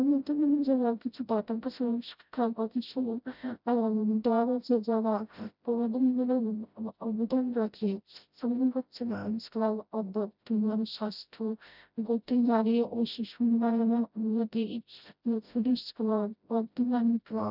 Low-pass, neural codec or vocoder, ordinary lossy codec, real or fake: 5.4 kHz; codec, 16 kHz, 0.5 kbps, FreqCodec, smaller model; none; fake